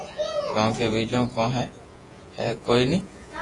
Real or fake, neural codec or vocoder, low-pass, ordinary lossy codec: fake; vocoder, 48 kHz, 128 mel bands, Vocos; 10.8 kHz; AAC, 32 kbps